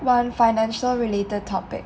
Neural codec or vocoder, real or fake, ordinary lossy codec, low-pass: none; real; none; none